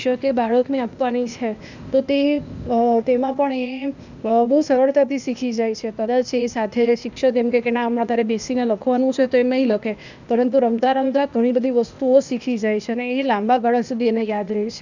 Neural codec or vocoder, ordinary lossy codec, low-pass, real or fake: codec, 16 kHz, 0.8 kbps, ZipCodec; none; 7.2 kHz; fake